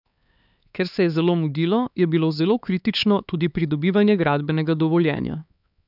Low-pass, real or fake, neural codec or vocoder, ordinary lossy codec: 5.4 kHz; fake; codec, 16 kHz, 4 kbps, X-Codec, HuBERT features, trained on LibriSpeech; none